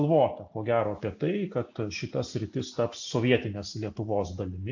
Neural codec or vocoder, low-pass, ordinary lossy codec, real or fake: none; 7.2 kHz; AAC, 48 kbps; real